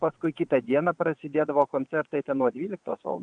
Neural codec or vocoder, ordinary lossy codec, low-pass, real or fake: vocoder, 22.05 kHz, 80 mel bands, Vocos; AAC, 64 kbps; 9.9 kHz; fake